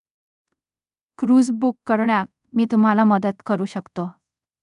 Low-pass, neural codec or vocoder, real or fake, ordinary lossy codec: 10.8 kHz; codec, 24 kHz, 0.5 kbps, DualCodec; fake; none